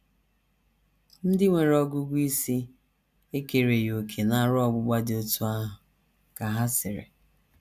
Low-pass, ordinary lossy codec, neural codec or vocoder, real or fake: 14.4 kHz; none; none; real